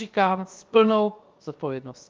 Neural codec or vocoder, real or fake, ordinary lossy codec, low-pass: codec, 16 kHz, 0.7 kbps, FocalCodec; fake; Opus, 24 kbps; 7.2 kHz